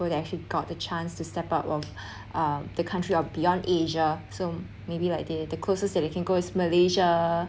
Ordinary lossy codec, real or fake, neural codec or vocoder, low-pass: none; real; none; none